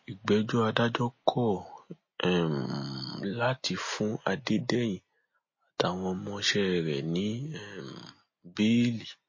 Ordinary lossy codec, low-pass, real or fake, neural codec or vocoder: MP3, 32 kbps; 7.2 kHz; real; none